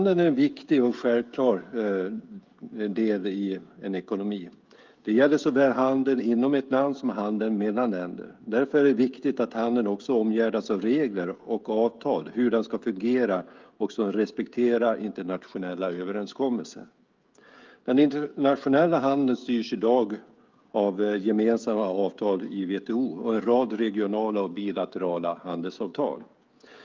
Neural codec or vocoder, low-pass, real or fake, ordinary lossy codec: codec, 16 kHz, 16 kbps, FreqCodec, smaller model; 7.2 kHz; fake; Opus, 32 kbps